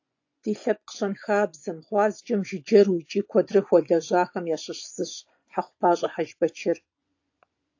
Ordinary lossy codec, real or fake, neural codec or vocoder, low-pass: AAC, 48 kbps; fake; vocoder, 24 kHz, 100 mel bands, Vocos; 7.2 kHz